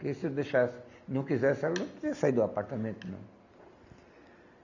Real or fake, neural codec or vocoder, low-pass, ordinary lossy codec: real; none; 7.2 kHz; none